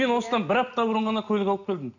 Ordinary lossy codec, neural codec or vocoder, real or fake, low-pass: none; none; real; 7.2 kHz